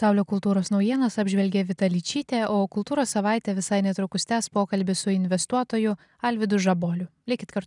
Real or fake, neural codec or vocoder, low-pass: real; none; 10.8 kHz